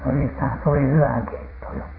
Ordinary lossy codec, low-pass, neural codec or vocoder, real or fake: AAC, 24 kbps; 5.4 kHz; vocoder, 44.1 kHz, 80 mel bands, Vocos; fake